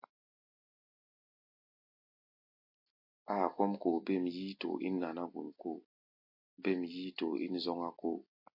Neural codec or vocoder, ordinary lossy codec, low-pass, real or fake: none; MP3, 32 kbps; 5.4 kHz; real